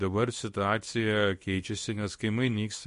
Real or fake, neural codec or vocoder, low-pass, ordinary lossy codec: fake; codec, 24 kHz, 0.9 kbps, WavTokenizer, small release; 10.8 kHz; MP3, 48 kbps